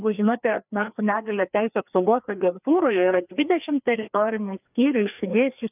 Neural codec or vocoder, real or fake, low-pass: codec, 16 kHz, 2 kbps, FreqCodec, larger model; fake; 3.6 kHz